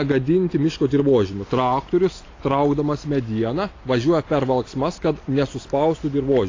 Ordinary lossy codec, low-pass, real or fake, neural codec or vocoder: AAC, 32 kbps; 7.2 kHz; real; none